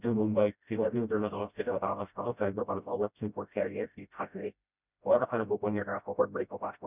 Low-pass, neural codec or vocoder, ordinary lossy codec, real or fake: 3.6 kHz; codec, 16 kHz, 0.5 kbps, FreqCodec, smaller model; none; fake